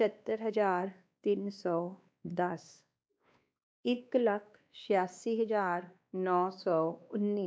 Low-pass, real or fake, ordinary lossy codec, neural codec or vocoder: none; fake; none; codec, 16 kHz, 2 kbps, X-Codec, WavLM features, trained on Multilingual LibriSpeech